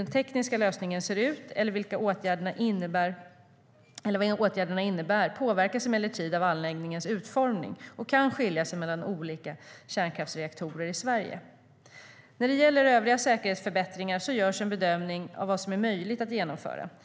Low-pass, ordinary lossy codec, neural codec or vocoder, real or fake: none; none; none; real